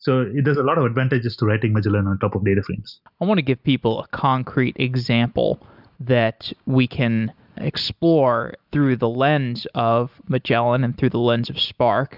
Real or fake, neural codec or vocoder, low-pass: real; none; 5.4 kHz